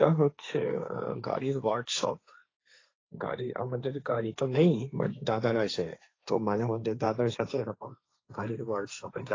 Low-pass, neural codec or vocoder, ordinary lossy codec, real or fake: 7.2 kHz; codec, 16 kHz, 1.1 kbps, Voila-Tokenizer; AAC, 32 kbps; fake